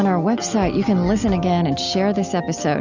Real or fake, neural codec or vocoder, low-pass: real; none; 7.2 kHz